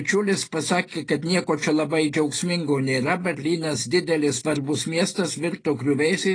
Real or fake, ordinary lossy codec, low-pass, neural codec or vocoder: real; AAC, 32 kbps; 9.9 kHz; none